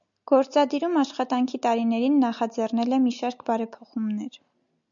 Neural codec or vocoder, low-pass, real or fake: none; 7.2 kHz; real